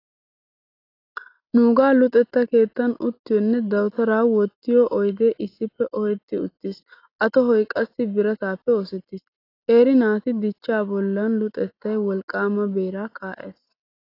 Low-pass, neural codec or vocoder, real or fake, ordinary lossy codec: 5.4 kHz; none; real; AAC, 32 kbps